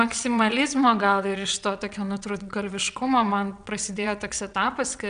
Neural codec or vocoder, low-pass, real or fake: vocoder, 22.05 kHz, 80 mel bands, WaveNeXt; 9.9 kHz; fake